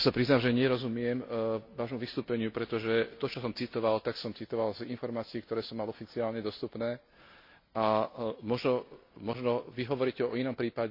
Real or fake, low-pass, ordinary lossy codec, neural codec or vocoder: real; 5.4 kHz; MP3, 32 kbps; none